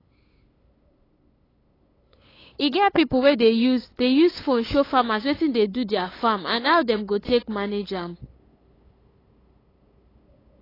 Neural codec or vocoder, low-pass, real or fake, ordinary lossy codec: codec, 16 kHz, 8 kbps, FunCodec, trained on LibriTTS, 25 frames a second; 5.4 kHz; fake; AAC, 24 kbps